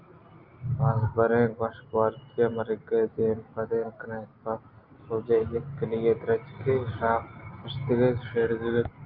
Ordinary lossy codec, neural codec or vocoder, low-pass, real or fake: Opus, 24 kbps; none; 5.4 kHz; real